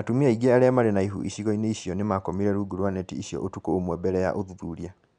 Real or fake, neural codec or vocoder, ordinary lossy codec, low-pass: real; none; none; 9.9 kHz